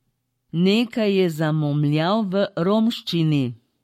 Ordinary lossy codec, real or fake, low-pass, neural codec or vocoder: MP3, 64 kbps; fake; 19.8 kHz; codec, 44.1 kHz, 7.8 kbps, Pupu-Codec